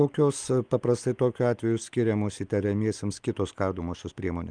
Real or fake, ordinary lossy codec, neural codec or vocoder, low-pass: real; Opus, 32 kbps; none; 9.9 kHz